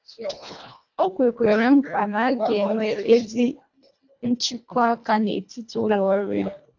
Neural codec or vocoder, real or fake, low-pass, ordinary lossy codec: codec, 24 kHz, 1.5 kbps, HILCodec; fake; 7.2 kHz; AAC, 48 kbps